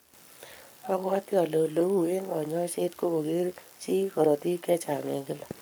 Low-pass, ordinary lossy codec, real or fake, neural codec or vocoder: none; none; fake; codec, 44.1 kHz, 7.8 kbps, Pupu-Codec